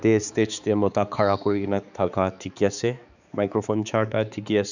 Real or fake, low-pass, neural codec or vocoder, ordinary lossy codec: fake; 7.2 kHz; codec, 16 kHz, 4 kbps, X-Codec, HuBERT features, trained on balanced general audio; none